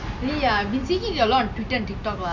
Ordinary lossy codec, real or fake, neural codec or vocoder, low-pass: none; real; none; 7.2 kHz